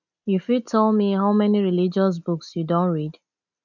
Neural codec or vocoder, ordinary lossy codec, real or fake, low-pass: none; none; real; 7.2 kHz